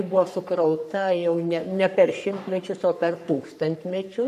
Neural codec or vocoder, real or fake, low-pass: codec, 44.1 kHz, 3.4 kbps, Pupu-Codec; fake; 14.4 kHz